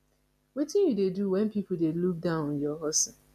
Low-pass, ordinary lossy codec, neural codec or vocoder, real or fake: 14.4 kHz; none; none; real